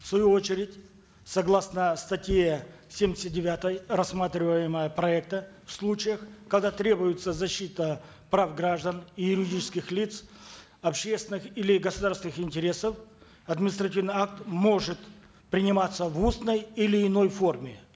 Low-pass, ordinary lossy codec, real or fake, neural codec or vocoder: none; none; real; none